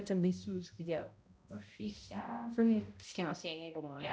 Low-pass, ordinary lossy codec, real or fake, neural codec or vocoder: none; none; fake; codec, 16 kHz, 0.5 kbps, X-Codec, HuBERT features, trained on balanced general audio